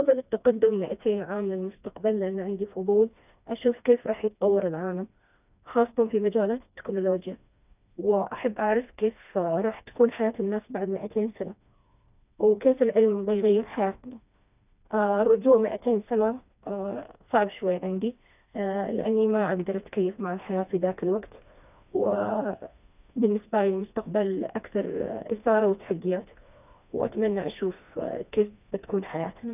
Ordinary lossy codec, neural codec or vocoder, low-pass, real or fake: none; codec, 16 kHz, 2 kbps, FreqCodec, smaller model; 3.6 kHz; fake